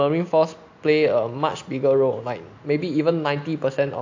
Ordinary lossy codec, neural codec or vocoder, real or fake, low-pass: AAC, 48 kbps; none; real; 7.2 kHz